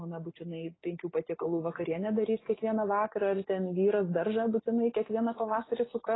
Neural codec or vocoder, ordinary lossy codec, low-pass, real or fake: none; AAC, 16 kbps; 7.2 kHz; real